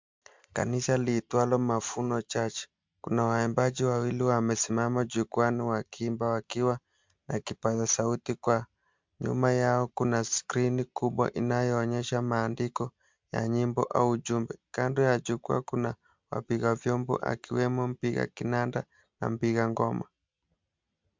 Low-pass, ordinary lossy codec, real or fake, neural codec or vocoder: 7.2 kHz; MP3, 64 kbps; real; none